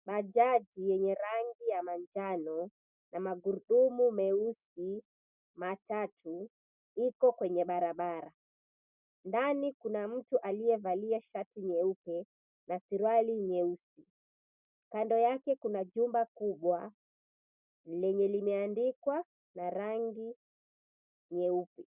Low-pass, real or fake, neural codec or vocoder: 3.6 kHz; real; none